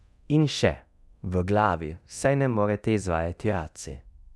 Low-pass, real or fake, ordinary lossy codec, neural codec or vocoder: none; fake; none; codec, 24 kHz, 0.9 kbps, DualCodec